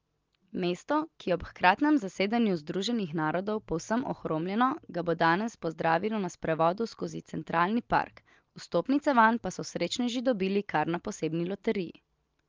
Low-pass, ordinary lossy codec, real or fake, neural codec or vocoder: 7.2 kHz; Opus, 24 kbps; real; none